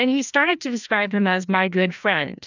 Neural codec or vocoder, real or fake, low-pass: codec, 16 kHz, 1 kbps, FreqCodec, larger model; fake; 7.2 kHz